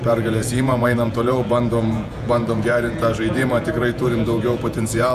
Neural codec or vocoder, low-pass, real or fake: vocoder, 48 kHz, 128 mel bands, Vocos; 14.4 kHz; fake